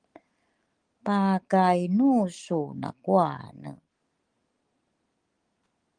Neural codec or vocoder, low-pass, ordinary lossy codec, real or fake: none; 9.9 kHz; Opus, 24 kbps; real